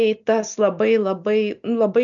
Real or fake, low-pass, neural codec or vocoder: real; 7.2 kHz; none